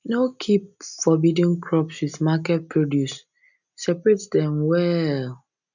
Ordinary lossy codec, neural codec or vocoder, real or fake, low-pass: none; none; real; 7.2 kHz